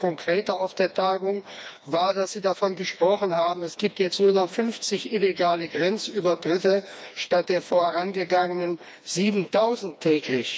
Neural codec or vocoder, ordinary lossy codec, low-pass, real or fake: codec, 16 kHz, 2 kbps, FreqCodec, smaller model; none; none; fake